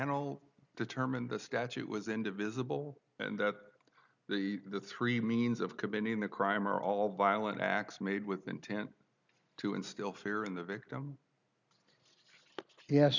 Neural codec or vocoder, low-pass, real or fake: none; 7.2 kHz; real